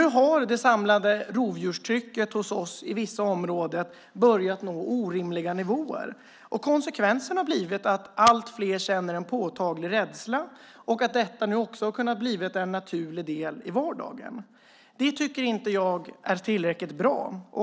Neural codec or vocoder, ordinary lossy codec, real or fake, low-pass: none; none; real; none